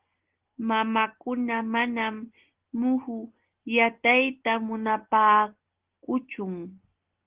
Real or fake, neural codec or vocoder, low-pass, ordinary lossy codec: real; none; 3.6 kHz; Opus, 16 kbps